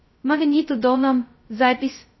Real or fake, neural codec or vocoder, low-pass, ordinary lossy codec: fake; codec, 16 kHz, 0.2 kbps, FocalCodec; 7.2 kHz; MP3, 24 kbps